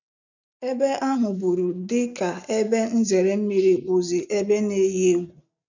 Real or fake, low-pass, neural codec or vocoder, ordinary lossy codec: real; 7.2 kHz; none; none